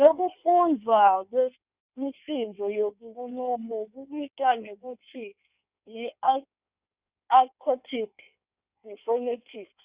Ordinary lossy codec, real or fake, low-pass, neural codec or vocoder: Opus, 64 kbps; fake; 3.6 kHz; codec, 16 kHz in and 24 kHz out, 1.1 kbps, FireRedTTS-2 codec